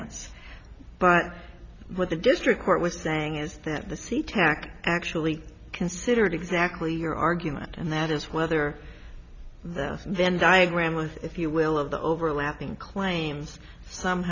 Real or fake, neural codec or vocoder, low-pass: real; none; 7.2 kHz